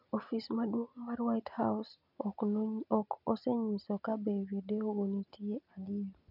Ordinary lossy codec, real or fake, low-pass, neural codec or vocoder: none; real; 5.4 kHz; none